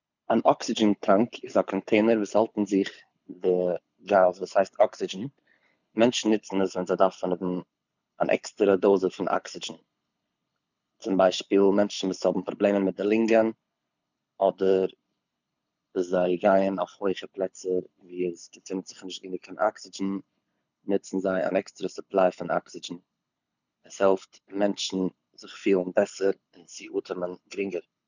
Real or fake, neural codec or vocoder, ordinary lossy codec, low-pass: fake; codec, 24 kHz, 6 kbps, HILCodec; none; 7.2 kHz